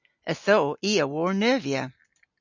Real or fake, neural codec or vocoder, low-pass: real; none; 7.2 kHz